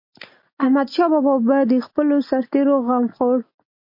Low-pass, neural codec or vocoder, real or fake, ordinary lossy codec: 5.4 kHz; none; real; MP3, 32 kbps